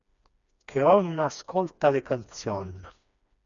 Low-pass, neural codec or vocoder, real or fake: 7.2 kHz; codec, 16 kHz, 2 kbps, FreqCodec, smaller model; fake